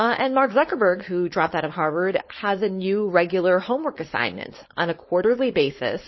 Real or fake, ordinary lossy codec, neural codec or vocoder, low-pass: fake; MP3, 24 kbps; codec, 16 kHz, 4.8 kbps, FACodec; 7.2 kHz